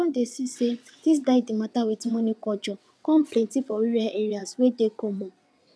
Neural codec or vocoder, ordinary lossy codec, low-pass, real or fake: vocoder, 22.05 kHz, 80 mel bands, WaveNeXt; none; none; fake